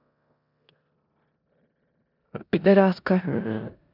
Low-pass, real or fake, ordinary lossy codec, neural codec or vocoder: 5.4 kHz; fake; none; codec, 16 kHz in and 24 kHz out, 0.9 kbps, LongCat-Audio-Codec, four codebook decoder